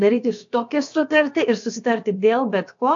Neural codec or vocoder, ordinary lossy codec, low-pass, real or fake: codec, 16 kHz, about 1 kbps, DyCAST, with the encoder's durations; AAC, 64 kbps; 7.2 kHz; fake